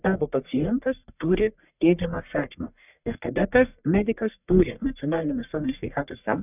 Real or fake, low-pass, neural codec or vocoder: fake; 3.6 kHz; codec, 44.1 kHz, 1.7 kbps, Pupu-Codec